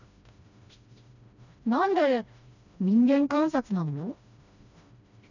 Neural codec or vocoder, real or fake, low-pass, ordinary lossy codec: codec, 16 kHz, 1 kbps, FreqCodec, smaller model; fake; 7.2 kHz; none